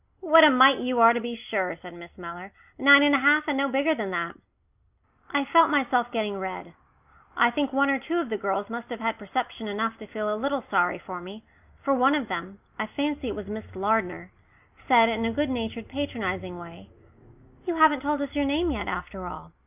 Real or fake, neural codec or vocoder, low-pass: real; none; 3.6 kHz